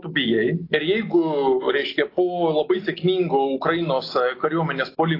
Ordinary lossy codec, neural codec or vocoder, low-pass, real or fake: AAC, 32 kbps; none; 5.4 kHz; real